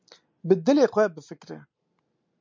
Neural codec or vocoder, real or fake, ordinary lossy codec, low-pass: none; real; MP3, 64 kbps; 7.2 kHz